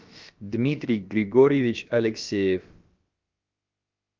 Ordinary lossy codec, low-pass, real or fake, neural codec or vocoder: Opus, 24 kbps; 7.2 kHz; fake; codec, 16 kHz, about 1 kbps, DyCAST, with the encoder's durations